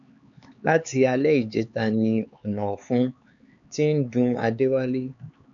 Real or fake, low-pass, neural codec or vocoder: fake; 7.2 kHz; codec, 16 kHz, 4 kbps, X-Codec, HuBERT features, trained on LibriSpeech